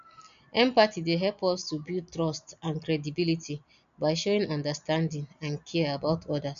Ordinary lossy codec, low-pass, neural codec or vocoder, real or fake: none; 7.2 kHz; none; real